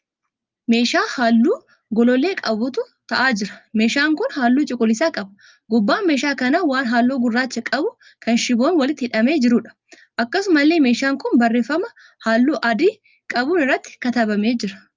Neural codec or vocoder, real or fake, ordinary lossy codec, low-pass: none; real; Opus, 24 kbps; 7.2 kHz